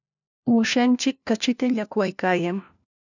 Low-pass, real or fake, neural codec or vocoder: 7.2 kHz; fake; codec, 16 kHz, 1 kbps, FunCodec, trained on LibriTTS, 50 frames a second